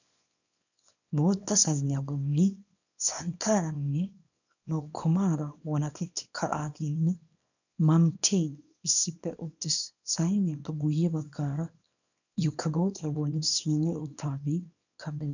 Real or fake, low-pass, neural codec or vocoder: fake; 7.2 kHz; codec, 24 kHz, 0.9 kbps, WavTokenizer, small release